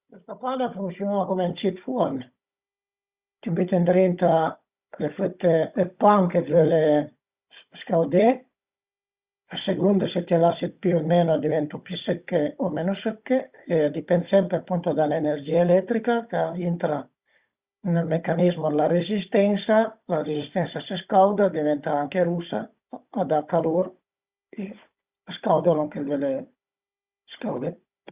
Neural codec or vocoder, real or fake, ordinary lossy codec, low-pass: codec, 16 kHz, 16 kbps, FunCodec, trained on Chinese and English, 50 frames a second; fake; Opus, 64 kbps; 3.6 kHz